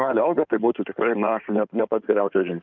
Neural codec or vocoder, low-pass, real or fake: codec, 16 kHz in and 24 kHz out, 2.2 kbps, FireRedTTS-2 codec; 7.2 kHz; fake